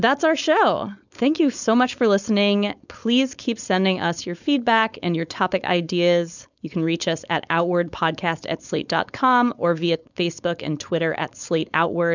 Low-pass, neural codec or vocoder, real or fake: 7.2 kHz; codec, 16 kHz, 4.8 kbps, FACodec; fake